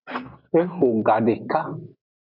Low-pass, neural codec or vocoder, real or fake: 5.4 kHz; none; real